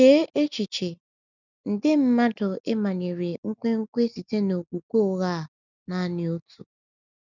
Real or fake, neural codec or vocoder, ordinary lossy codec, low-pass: real; none; none; 7.2 kHz